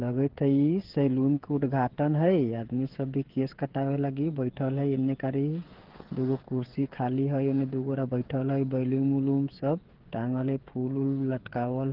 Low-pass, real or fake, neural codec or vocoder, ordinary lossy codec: 5.4 kHz; fake; codec, 16 kHz, 8 kbps, FreqCodec, smaller model; Opus, 16 kbps